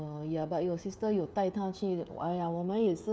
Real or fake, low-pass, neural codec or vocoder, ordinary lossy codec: fake; none; codec, 16 kHz, 16 kbps, FreqCodec, smaller model; none